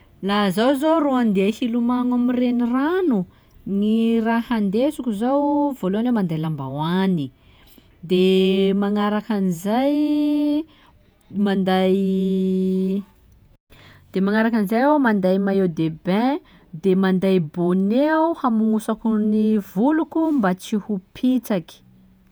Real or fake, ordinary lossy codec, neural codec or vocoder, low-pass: fake; none; vocoder, 48 kHz, 128 mel bands, Vocos; none